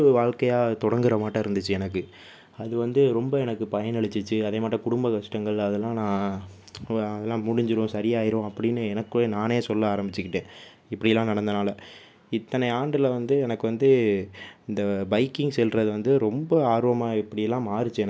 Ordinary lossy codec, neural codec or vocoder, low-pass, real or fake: none; none; none; real